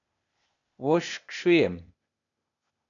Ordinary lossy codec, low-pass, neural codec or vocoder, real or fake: MP3, 96 kbps; 7.2 kHz; codec, 16 kHz, 0.8 kbps, ZipCodec; fake